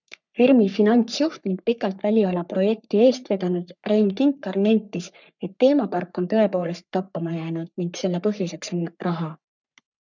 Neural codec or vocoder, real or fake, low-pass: codec, 44.1 kHz, 3.4 kbps, Pupu-Codec; fake; 7.2 kHz